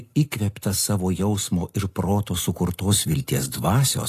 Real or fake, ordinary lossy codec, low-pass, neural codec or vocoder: real; AAC, 48 kbps; 14.4 kHz; none